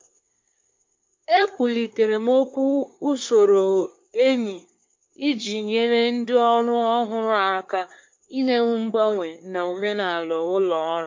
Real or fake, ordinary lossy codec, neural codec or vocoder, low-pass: fake; MP3, 48 kbps; codec, 24 kHz, 1 kbps, SNAC; 7.2 kHz